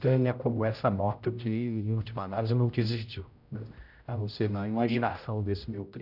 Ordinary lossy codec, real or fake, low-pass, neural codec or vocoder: none; fake; 5.4 kHz; codec, 16 kHz, 0.5 kbps, X-Codec, HuBERT features, trained on general audio